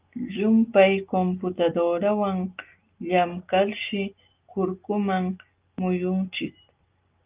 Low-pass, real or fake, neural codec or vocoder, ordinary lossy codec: 3.6 kHz; real; none; Opus, 32 kbps